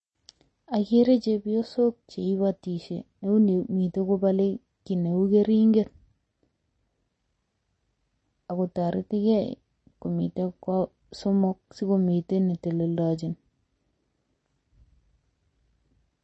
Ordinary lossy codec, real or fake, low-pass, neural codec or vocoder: MP3, 32 kbps; real; 10.8 kHz; none